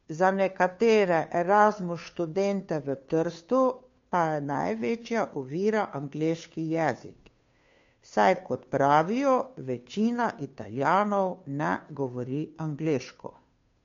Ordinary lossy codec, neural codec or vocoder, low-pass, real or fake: MP3, 48 kbps; codec, 16 kHz, 2 kbps, FunCodec, trained on Chinese and English, 25 frames a second; 7.2 kHz; fake